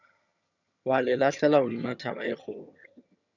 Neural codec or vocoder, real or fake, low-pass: vocoder, 22.05 kHz, 80 mel bands, HiFi-GAN; fake; 7.2 kHz